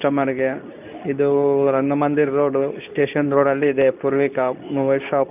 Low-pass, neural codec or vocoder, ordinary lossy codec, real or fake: 3.6 kHz; codec, 24 kHz, 0.9 kbps, WavTokenizer, medium speech release version 2; none; fake